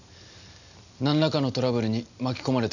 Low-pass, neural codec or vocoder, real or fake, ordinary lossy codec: 7.2 kHz; none; real; none